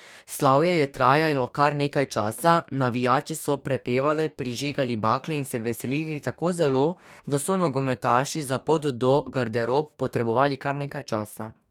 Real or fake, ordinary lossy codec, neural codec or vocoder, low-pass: fake; none; codec, 44.1 kHz, 2.6 kbps, DAC; 19.8 kHz